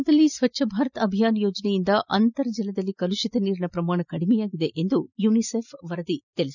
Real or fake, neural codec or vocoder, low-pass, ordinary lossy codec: real; none; 7.2 kHz; none